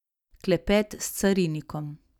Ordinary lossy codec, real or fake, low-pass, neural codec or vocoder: none; real; 19.8 kHz; none